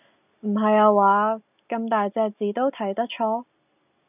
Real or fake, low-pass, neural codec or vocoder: real; 3.6 kHz; none